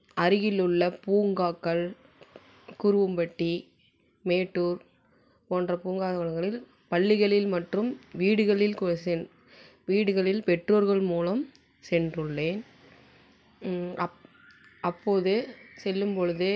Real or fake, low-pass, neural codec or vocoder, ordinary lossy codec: real; none; none; none